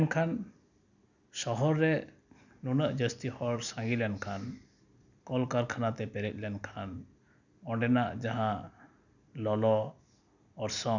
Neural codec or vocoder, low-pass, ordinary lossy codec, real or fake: none; 7.2 kHz; none; real